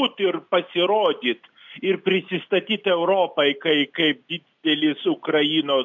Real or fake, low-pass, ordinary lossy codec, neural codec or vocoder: real; 7.2 kHz; MP3, 64 kbps; none